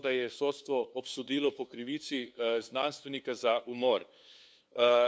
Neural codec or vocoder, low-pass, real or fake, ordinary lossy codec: codec, 16 kHz, 2 kbps, FunCodec, trained on LibriTTS, 25 frames a second; none; fake; none